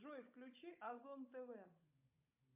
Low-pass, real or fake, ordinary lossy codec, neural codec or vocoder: 3.6 kHz; fake; MP3, 32 kbps; codec, 16 kHz, 16 kbps, FunCodec, trained on Chinese and English, 50 frames a second